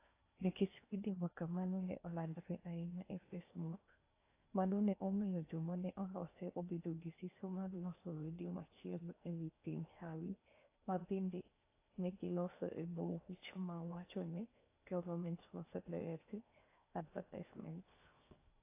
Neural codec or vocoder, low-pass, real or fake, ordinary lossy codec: codec, 16 kHz in and 24 kHz out, 0.8 kbps, FocalCodec, streaming, 65536 codes; 3.6 kHz; fake; AAC, 24 kbps